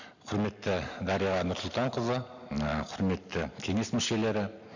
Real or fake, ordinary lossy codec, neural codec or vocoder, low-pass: real; none; none; 7.2 kHz